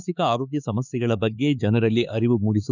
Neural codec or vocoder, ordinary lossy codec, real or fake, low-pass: codec, 16 kHz, 4 kbps, X-Codec, HuBERT features, trained on LibriSpeech; none; fake; 7.2 kHz